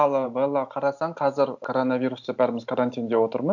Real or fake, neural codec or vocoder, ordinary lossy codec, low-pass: real; none; none; 7.2 kHz